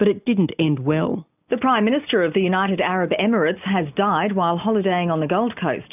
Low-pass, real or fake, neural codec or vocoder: 3.6 kHz; real; none